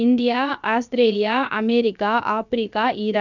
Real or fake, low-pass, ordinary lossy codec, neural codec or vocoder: fake; 7.2 kHz; none; codec, 16 kHz, about 1 kbps, DyCAST, with the encoder's durations